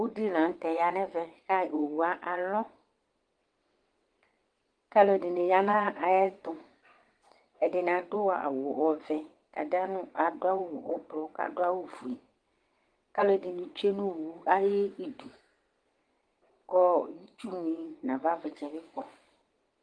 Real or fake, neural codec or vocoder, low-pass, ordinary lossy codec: fake; vocoder, 22.05 kHz, 80 mel bands, WaveNeXt; 9.9 kHz; Opus, 24 kbps